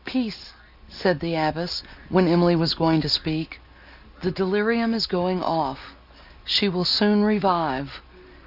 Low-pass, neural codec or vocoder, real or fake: 5.4 kHz; none; real